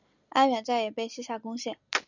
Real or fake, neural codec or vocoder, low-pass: real; none; 7.2 kHz